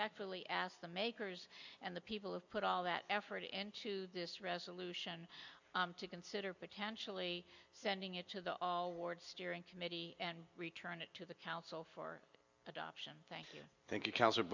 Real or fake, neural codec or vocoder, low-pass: real; none; 7.2 kHz